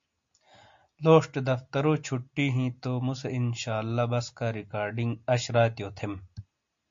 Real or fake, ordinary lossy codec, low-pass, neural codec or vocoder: real; MP3, 48 kbps; 7.2 kHz; none